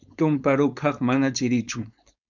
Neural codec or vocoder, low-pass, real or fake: codec, 16 kHz, 4.8 kbps, FACodec; 7.2 kHz; fake